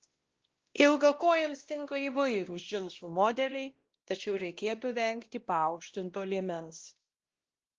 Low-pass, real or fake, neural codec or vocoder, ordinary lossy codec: 7.2 kHz; fake; codec, 16 kHz, 1 kbps, X-Codec, WavLM features, trained on Multilingual LibriSpeech; Opus, 16 kbps